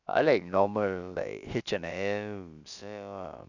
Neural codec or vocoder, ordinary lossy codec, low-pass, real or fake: codec, 16 kHz, about 1 kbps, DyCAST, with the encoder's durations; none; 7.2 kHz; fake